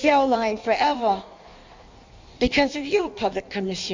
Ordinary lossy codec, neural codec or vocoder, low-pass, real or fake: MP3, 64 kbps; codec, 16 kHz in and 24 kHz out, 1.1 kbps, FireRedTTS-2 codec; 7.2 kHz; fake